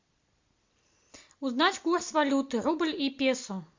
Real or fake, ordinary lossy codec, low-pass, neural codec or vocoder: real; MP3, 64 kbps; 7.2 kHz; none